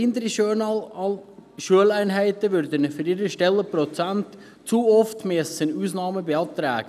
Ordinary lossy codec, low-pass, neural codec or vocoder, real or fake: none; 14.4 kHz; none; real